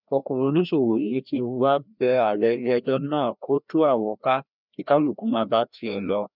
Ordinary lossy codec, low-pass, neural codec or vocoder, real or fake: none; 5.4 kHz; codec, 16 kHz, 1 kbps, FreqCodec, larger model; fake